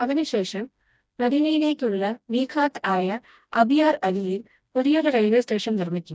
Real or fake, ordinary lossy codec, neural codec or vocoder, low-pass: fake; none; codec, 16 kHz, 1 kbps, FreqCodec, smaller model; none